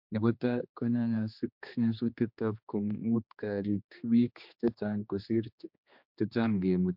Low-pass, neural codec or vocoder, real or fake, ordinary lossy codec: 5.4 kHz; codec, 16 kHz, 2 kbps, X-Codec, HuBERT features, trained on general audio; fake; MP3, 48 kbps